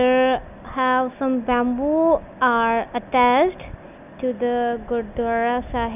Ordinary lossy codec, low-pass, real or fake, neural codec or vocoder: none; 3.6 kHz; real; none